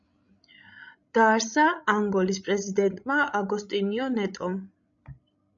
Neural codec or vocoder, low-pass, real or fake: codec, 16 kHz, 16 kbps, FreqCodec, larger model; 7.2 kHz; fake